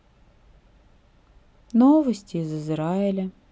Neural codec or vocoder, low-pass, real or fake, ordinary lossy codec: none; none; real; none